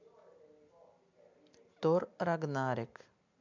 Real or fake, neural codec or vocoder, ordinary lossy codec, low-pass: real; none; MP3, 64 kbps; 7.2 kHz